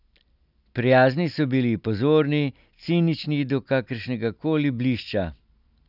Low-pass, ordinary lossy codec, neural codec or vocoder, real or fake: 5.4 kHz; none; none; real